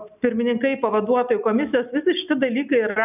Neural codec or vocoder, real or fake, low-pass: none; real; 3.6 kHz